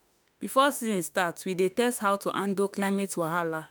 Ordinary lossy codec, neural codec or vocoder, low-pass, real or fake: none; autoencoder, 48 kHz, 32 numbers a frame, DAC-VAE, trained on Japanese speech; none; fake